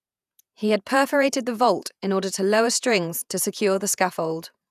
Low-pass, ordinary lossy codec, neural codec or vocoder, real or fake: 14.4 kHz; none; vocoder, 48 kHz, 128 mel bands, Vocos; fake